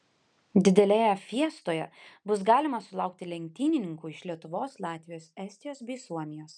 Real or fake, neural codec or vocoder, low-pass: real; none; 9.9 kHz